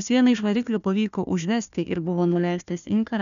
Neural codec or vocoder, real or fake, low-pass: codec, 16 kHz, 1 kbps, FunCodec, trained on Chinese and English, 50 frames a second; fake; 7.2 kHz